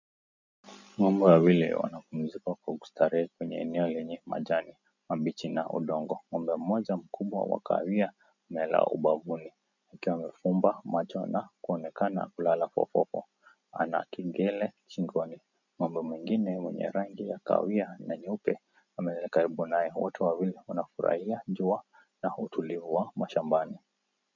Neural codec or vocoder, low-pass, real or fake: none; 7.2 kHz; real